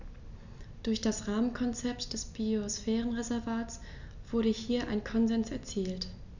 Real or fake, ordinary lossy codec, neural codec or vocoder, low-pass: real; none; none; 7.2 kHz